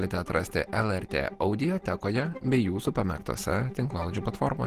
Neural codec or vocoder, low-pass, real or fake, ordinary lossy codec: none; 14.4 kHz; real; Opus, 16 kbps